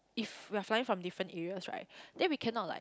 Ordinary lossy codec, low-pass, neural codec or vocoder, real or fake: none; none; none; real